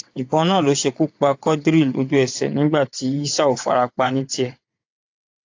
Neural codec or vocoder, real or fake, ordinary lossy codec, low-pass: vocoder, 22.05 kHz, 80 mel bands, WaveNeXt; fake; AAC, 48 kbps; 7.2 kHz